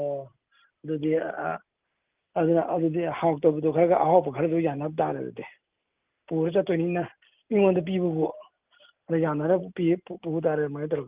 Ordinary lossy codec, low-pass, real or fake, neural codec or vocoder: Opus, 24 kbps; 3.6 kHz; real; none